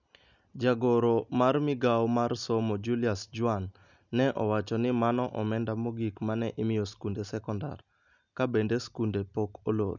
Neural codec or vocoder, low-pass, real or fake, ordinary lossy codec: none; 7.2 kHz; real; none